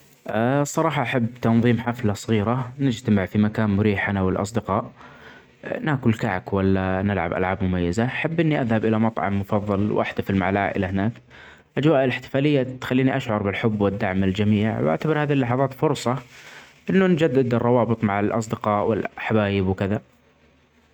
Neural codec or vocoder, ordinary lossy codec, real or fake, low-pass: none; none; real; 19.8 kHz